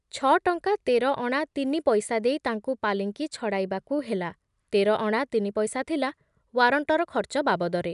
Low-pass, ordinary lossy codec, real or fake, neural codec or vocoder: 10.8 kHz; none; real; none